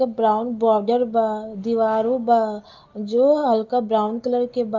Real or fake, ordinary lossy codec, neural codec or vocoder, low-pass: real; Opus, 24 kbps; none; 7.2 kHz